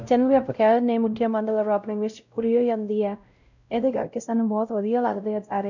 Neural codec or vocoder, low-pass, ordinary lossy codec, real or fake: codec, 16 kHz, 0.5 kbps, X-Codec, WavLM features, trained on Multilingual LibriSpeech; 7.2 kHz; none; fake